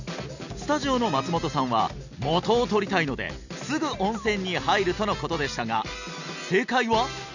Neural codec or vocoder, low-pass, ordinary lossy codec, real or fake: none; 7.2 kHz; none; real